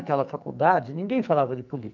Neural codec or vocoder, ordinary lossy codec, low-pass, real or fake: codec, 44.1 kHz, 2.6 kbps, SNAC; none; 7.2 kHz; fake